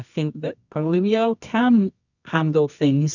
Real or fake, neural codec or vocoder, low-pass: fake; codec, 24 kHz, 0.9 kbps, WavTokenizer, medium music audio release; 7.2 kHz